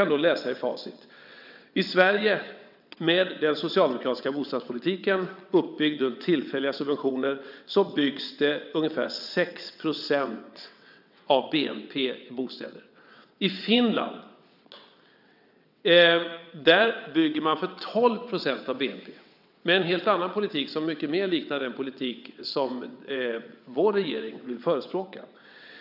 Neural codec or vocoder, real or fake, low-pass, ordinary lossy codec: vocoder, 22.05 kHz, 80 mel bands, WaveNeXt; fake; 5.4 kHz; none